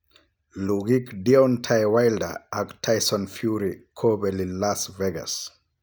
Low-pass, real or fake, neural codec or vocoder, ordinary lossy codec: none; real; none; none